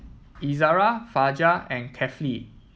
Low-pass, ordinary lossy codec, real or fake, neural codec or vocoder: none; none; real; none